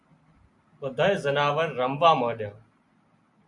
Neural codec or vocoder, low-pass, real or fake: none; 10.8 kHz; real